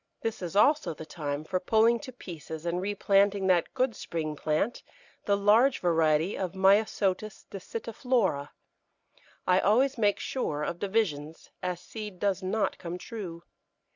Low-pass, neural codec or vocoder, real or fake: 7.2 kHz; none; real